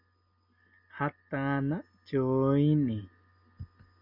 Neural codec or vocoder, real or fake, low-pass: none; real; 5.4 kHz